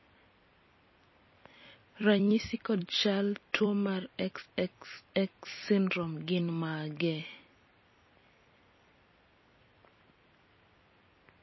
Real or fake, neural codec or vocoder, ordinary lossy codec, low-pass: fake; vocoder, 44.1 kHz, 128 mel bands every 256 samples, BigVGAN v2; MP3, 24 kbps; 7.2 kHz